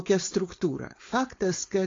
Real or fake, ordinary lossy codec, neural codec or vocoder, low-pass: fake; AAC, 32 kbps; codec, 16 kHz, 4.8 kbps, FACodec; 7.2 kHz